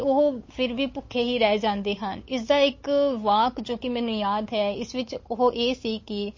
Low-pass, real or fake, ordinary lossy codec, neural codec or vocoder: 7.2 kHz; fake; MP3, 32 kbps; codec, 16 kHz, 8 kbps, FunCodec, trained on Chinese and English, 25 frames a second